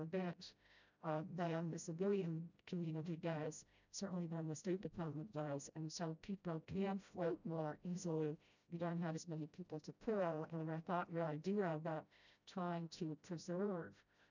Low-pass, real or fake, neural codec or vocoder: 7.2 kHz; fake; codec, 16 kHz, 0.5 kbps, FreqCodec, smaller model